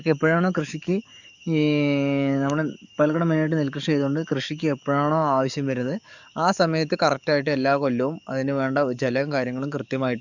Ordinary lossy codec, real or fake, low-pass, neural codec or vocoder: none; fake; 7.2 kHz; codec, 44.1 kHz, 7.8 kbps, DAC